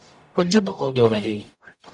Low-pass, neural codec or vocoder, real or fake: 10.8 kHz; codec, 44.1 kHz, 0.9 kbps, DAC; fake